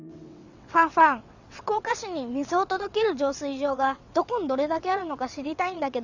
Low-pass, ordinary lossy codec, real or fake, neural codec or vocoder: 7.2 kHz; none; fake; vocoder, 22.05 kHz, 80 mel bands, WaveNeXt